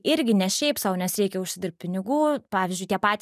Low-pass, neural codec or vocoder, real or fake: 14.4 kHz; none; real